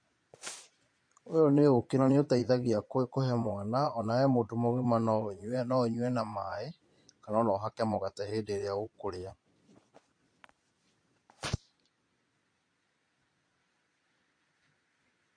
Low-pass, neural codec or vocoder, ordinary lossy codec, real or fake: 9.9 kHz; vocoder, 22.05 kHz, 80 mel bands, Vocos; MP3, 48 kbps; fake